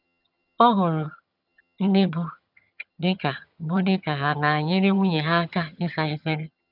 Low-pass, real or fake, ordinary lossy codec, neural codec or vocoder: 5.4 kHz; fake; none; vocoder, 22.05 kHz, 80 mel bands, HiFi-GAN